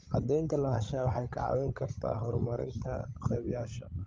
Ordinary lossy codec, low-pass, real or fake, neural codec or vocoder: Opus, 32 kbps; 7.2 kHz; fake; codec, 16 kHz, 16 kbps, FreqCodec, larger model